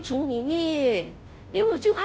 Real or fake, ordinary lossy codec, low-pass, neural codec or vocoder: fake; none; none; codec, 16 kHz, 0.5 kbps, FunCodec, trained on Chinese and English, 25 frames a second